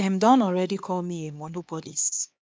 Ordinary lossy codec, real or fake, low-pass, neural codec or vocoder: none; fake; none; codec, 16 kHz, 2 kbps, X-Codec, HuBERT features, trained on LibriSpeech